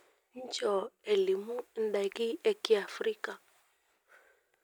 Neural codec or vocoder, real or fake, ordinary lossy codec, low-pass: none; real; none; none